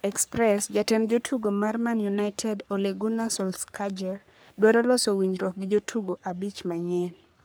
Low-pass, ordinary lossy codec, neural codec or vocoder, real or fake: none; none; codec, 44.1 kHz, 3.4 kbps, Pupu-Codec; fake